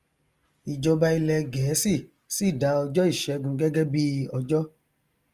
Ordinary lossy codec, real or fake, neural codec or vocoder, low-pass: Opus, 32 kbps; real; none; 14.4 kHz